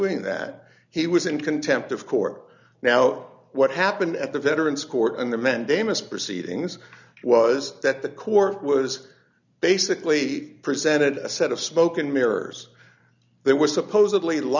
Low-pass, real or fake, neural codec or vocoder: 7.2 kHz; real; none